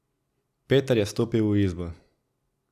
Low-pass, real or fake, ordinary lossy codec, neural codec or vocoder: 14.4 kHz; real; none; none